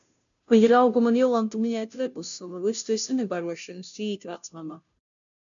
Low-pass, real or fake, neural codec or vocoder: 7.2 kHz; fake; codec, 16 kHz, 0.5 kbps, FunCodec, trained on Chinese and English, 25 frames a second